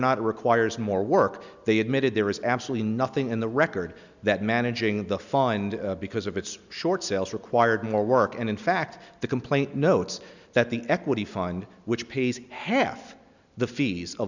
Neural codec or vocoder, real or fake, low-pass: none; real; 7.2 kHz